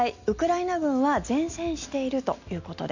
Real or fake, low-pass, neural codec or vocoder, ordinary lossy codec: real; 7.2 kHz; none; none